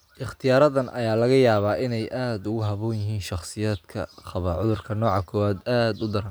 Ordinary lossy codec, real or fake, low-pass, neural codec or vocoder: none; real; none; none